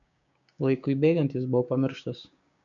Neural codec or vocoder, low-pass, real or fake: codec, 16 kHz, 6 kbps, DAC; 7.2 kHz; fake